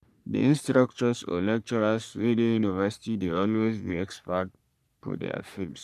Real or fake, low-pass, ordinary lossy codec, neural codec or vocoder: fake; 14.4 kHz; none; codec, 44.1 kHz, 3.4 kbps, Pupu-Codec